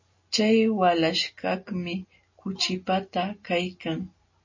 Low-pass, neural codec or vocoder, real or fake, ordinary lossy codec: 7.2 kHz; none; real; MP3, 32 kbps